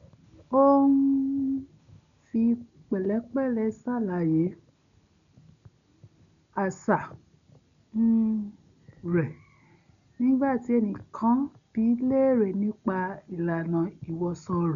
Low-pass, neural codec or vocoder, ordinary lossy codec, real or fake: 7.2 kHz; none; none; real